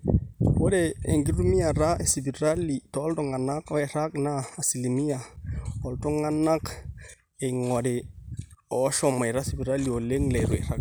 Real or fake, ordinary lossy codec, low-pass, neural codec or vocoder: real; none; none; none